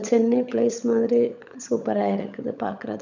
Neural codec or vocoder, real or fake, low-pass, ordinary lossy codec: codec, 16 kHz, 16 kbps, FunCodec, trained on LibriTTS, 50 frames a second; fake; 7.2 kHz; none